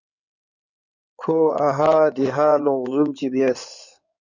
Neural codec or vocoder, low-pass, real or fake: codec, 16 kHz in and 24 kHz out, 2.2 kbps, FireRedTTS-2 codec; 7.2 kHz; fake